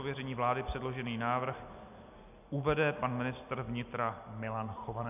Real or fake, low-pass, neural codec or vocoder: real; 3.6 kHz; none